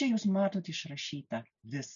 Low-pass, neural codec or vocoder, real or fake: 7.2 kHz; none; real